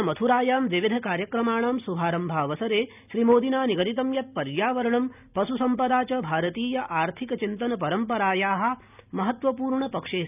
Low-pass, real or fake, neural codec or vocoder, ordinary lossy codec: 3.6 kHz; real; none; none